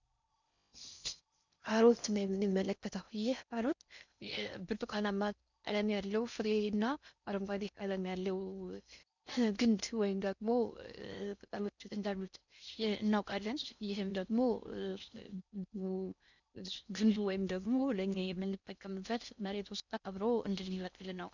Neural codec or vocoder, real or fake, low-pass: codec, 16 kHz in and 24 kHz out, 0.6 kbps, FocalCodec, streaming, 4096 codes; fake; 7.2 kHz